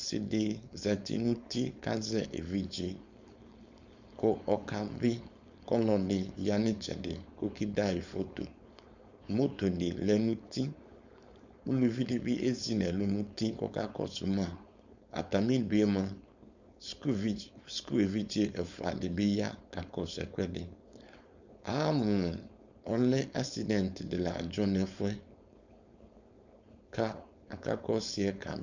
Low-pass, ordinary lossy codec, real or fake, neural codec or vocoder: 7.2 kHz; Opus, 64 kbps; fake; codec, 16 kHz, 4.8 kbps, FACodec